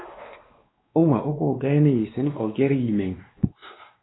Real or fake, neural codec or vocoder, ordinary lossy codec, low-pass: fake; codec, 16 kHz, 2 kbps, X-Codec, WavLM features, trained on Multilingual LibriSpeech; AAC, 16 kbps; 7.2 kHz